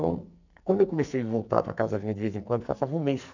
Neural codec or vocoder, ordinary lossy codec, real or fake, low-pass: codec, 44.1 kHz, 2.6 kbps, SNAC; none; fake; 7.2 kHz